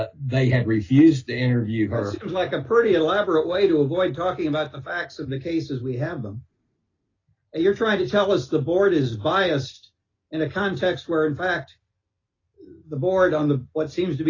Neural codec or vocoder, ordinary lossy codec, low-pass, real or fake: none; AAC, 32 kbps; 7.2 kHz; real